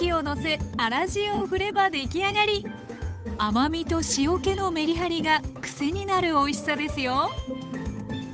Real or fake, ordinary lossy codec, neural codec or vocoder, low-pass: fake; none; codec, 16 kHz, 8 kbps, FunCodec, trained on Chinese and English, 25 frames a second; none